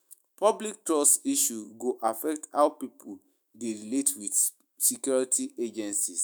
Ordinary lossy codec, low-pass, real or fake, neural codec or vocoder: none; none; fake; autoencoder, 48 kHz, 128 numbers a frame, DAC-VAE, trained on Japanese speech